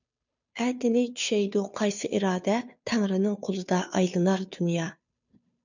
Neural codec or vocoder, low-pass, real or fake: codec, 16 kHz, 2 kbps, FunCodec, trained on Chinese and English, 25 frames a second; 7.2 kHz; fake